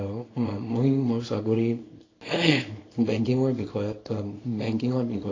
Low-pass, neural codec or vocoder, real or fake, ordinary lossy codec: 7.2 kHz; codec, 24 kHz, 0.9 kbps, WavTokenizer, small release; fake; AAC, 32 kbps